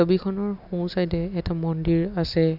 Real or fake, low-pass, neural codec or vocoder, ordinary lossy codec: real; 5.4 kHz; none; none